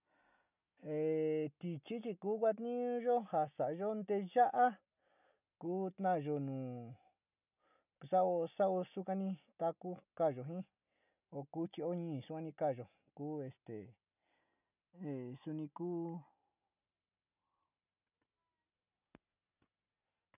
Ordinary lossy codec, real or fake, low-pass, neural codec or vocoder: none; real; 3.6 kHz; none